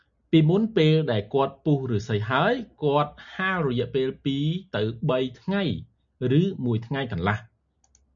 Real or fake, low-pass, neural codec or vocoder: real; 7.2 kHz; none